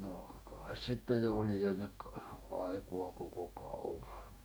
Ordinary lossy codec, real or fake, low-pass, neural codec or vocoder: none; fake; none; codec, 44.1 kHz, 2.6 kbps, DAC